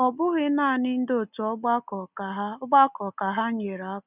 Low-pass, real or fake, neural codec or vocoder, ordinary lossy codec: 3.6 kHz; real; none; none